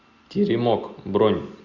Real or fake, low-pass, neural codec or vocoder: real; 7.2 kHz; none